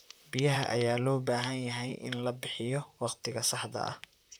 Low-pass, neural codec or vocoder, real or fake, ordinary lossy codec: none; vocoder, 44.1 kHz, 128 mel bands, Pupu-Vocoder; fake; none